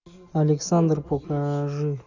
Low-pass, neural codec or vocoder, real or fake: 7.2 kHz; none; real